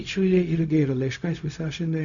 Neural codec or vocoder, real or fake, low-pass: codec, 16 kHz, 0.4 kbps, LongCat-Audio-Codec; fake; 7.2 kHz